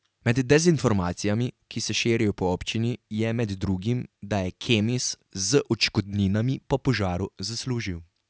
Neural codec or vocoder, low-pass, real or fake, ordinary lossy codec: none; none; real; none